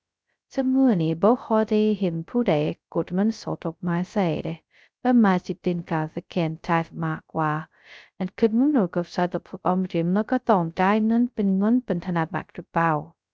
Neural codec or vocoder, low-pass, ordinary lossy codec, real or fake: codec, 16 kHz, 0.2 kbps, FocalCodec; none; none; fake